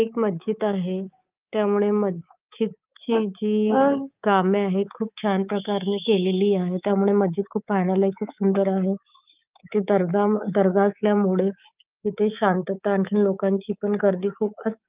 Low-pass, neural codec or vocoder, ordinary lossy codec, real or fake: 3.6 kHz; codec, 24 kHz, 3.1 kbps, DualCodec; Opus, 24 kbps; fake